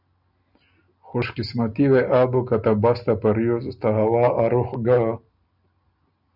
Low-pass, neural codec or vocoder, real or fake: 5.4 kHz; none; real